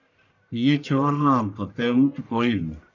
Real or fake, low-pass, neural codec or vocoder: fake; 7.2 kHz; codec, 44.1 kHz, 1.7 kbps, Pupu-Codec